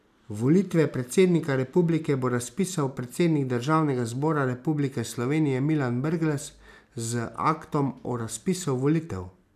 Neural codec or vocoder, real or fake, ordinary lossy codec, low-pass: none; real; none; 14.4 kHz